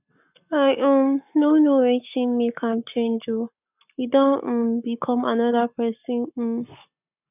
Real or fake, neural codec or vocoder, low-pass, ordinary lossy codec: fake; codec, 44.1 kHz, 7.8 kbps, Pupu-Codec; 3.6 kHz; none